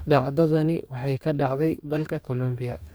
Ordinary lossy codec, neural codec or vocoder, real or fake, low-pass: none; codec, 44.1 kHz, 2.6 kbps, DAC; fake; none